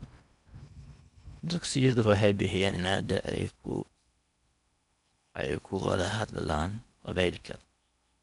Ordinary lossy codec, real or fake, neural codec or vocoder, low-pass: none; fake; codec, 16 kHz in and 24 kHz out, 0.8 kbps, FocalCodec, streaming, 65536 codes; 10.8 kHz